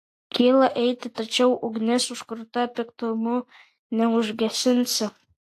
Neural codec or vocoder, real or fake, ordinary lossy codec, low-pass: none; real; AAC, 64 kbps; 14.4 kHz